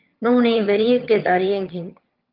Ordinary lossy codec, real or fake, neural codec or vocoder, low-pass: Opus, 32 kbps; fake; vocoder, 22.05 kHz, 80 mel bands, HiFi-GAN; 5.4 kHz